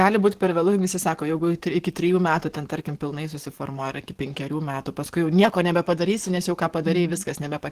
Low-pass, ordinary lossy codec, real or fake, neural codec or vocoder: 14.4 kHz; Opus, 16 kbps; fake; codec, 44.1 kHz, 7.8 kbps, DAC